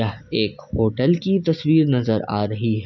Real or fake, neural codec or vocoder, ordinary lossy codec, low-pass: real; none; none; 7.2 kHz